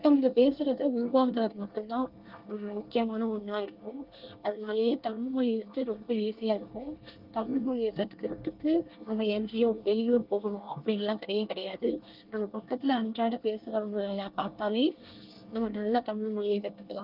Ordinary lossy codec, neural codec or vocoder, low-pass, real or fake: Opus, 32 kbps; codec, 24 kHz, 1 kbps, SNAC; 5.4 kHz; fake